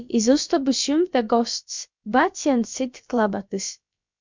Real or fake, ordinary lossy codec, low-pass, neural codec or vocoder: fake; MP3, 64 kbps; 7.2 kHz; codec, 16 kHz, about 1 kbps, DyCAST, with the encoder's durations